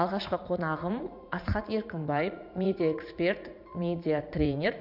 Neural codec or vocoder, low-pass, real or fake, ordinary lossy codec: vocoder, 44.1 kHz, 80 mel bands, Vocos; 5.4 kHz; fake; none